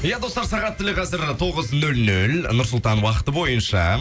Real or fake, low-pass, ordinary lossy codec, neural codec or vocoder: real; none; none; none